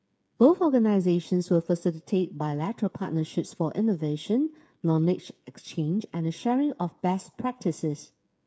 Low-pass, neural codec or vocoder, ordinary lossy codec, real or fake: none; codec, 16 kHz, 8 kbps, FreqCodec, smaller model; none; fake